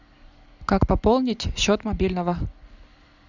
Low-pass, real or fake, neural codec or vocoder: 7.2 kHz; real; none